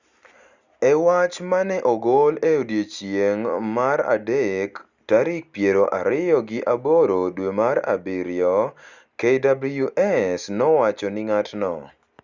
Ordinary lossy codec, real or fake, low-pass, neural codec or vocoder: Opus, 64 kbps; real; 7.2 kHz; none